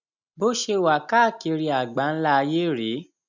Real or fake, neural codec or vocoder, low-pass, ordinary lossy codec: real; none; 7.2 kHz; none